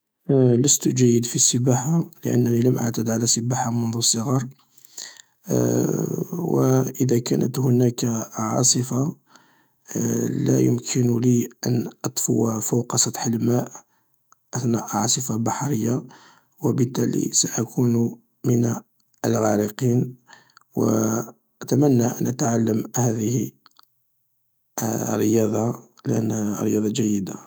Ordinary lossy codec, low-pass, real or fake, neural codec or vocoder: none; none; fake; autoencoder, 48 kHz, 128 numbers a frame, DAC-VAE, trained on Japanese speech